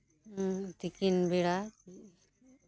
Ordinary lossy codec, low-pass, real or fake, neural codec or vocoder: none; none; real; none